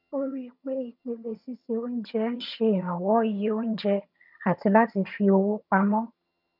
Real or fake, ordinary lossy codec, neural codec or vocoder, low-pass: fake; none; vocoder, 22.05 kHz, 80 mel bands, HiFi-GAN; 5.4 kHz